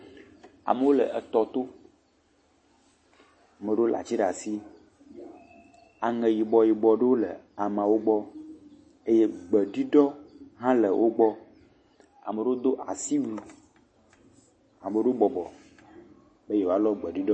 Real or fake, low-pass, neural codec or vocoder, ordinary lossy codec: real; 9.9 kHz; none; MP3, 32 kbps